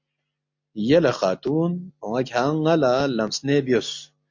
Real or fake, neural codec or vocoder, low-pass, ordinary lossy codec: real; none; 7.2 kHz; MP3, 48 kbps